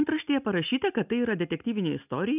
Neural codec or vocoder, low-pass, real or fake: none; 3.6 kHz; real